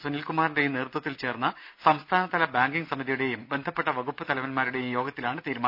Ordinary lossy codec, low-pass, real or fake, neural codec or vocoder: none; 5.4 kHz; real; none